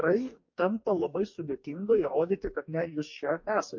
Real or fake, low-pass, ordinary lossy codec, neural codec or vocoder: fake; 7.2 kHz; MP3, 48 kbps; codec, 44.1 kHz, 2.6 kbps, DAC